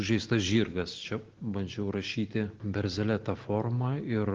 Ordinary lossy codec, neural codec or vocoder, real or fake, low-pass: Opus, 16 kbps; none; real; 7.2 kHz